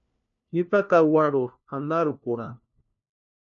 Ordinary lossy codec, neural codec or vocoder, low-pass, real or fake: AAC, 64 kbps; codec, 16 kHz, 1 kbps, FunCodec, trained on LibriTTS, 50 frames a second; 7.2 kHz; fake